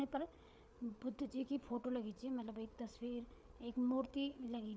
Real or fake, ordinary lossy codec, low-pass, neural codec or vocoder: fake; none; none; codec, 16 kHz, 8 kbps, FreqCodec, larger model